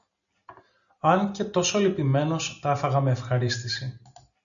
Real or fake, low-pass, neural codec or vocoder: real; 7.2 kHz; none